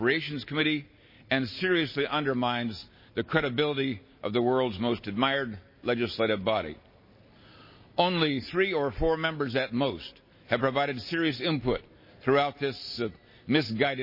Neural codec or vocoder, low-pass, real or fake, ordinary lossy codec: none; 5.4 kHz; real; MP3, 24 kbps